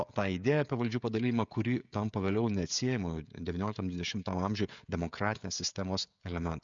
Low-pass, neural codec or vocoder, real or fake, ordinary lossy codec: 7.2 kHz; codec, 16 kHz, 16 kbps, FreqCodec, smaller model; fake; MP3, 64 kbps